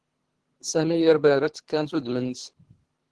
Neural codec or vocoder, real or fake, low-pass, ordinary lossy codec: codec, 24 kHz, 3 kbps, HILCodec; fake; 10.8 kHz; Opus, 16 kbps